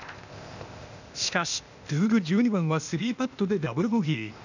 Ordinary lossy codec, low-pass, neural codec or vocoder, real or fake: none; 7.2 kHz; codec, 16 kHz, 0.8 kbps, ZipCodec; fake